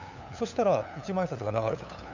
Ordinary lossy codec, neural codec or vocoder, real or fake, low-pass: none; codec, 16 kHz, 8 kbps, FunCodec, trained on LibriTTS, 25 frames a second; fake; 7.2 kHz